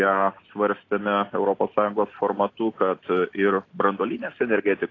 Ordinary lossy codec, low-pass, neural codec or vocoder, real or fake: AAC, 32 kbps; 7.2 kHz; none; real